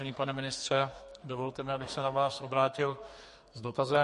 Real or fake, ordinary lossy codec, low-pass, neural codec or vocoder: fake; MP3, 48 kbps; 14.4 kHz; codec, 44.1 kHz, 2.6 kbps, SNAC